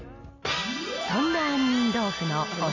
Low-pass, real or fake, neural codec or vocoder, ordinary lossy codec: 7.2 kHz; real; none; none